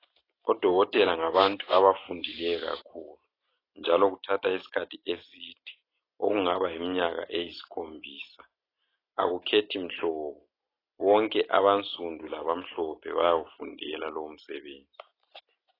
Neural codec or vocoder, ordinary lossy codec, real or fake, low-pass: none; AAC, 24 kbps; real; 5.4 kHz